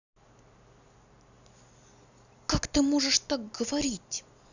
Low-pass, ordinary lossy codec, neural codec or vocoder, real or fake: 7.2 kHz; none; none; real